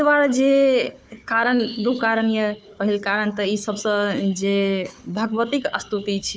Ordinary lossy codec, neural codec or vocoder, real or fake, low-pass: none; codec, 16 kHz, 4 kbps, FunCodec, trained on Chinese and English, 50 frames a second; fake; none